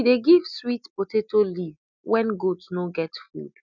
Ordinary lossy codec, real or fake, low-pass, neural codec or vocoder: none; real; 7.2 kHz; none